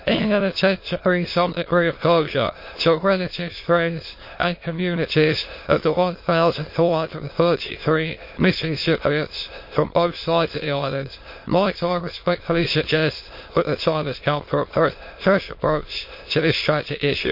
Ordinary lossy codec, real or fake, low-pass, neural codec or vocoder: MP3, 32 kbps; fake; 5.4 kHz; autoencoder, 22.05 kHz, a latent of 192 numbers a frame, VITS, trained on many speakers